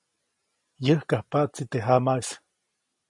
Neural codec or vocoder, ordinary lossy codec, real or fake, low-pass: none; MP3, 48 kbps; real; 10.8 kHz